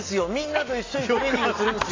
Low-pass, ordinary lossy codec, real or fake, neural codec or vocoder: 7.2 kHz; AAC, 32 kbps; real; none